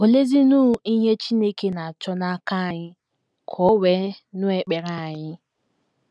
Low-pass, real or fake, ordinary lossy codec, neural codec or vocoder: none; real; none; none